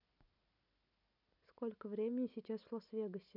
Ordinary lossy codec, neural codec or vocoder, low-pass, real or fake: none; none; 5.4 kHz; real